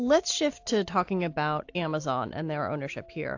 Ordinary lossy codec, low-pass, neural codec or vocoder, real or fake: AAC, 48 kbps; 7.2 kHz; none; real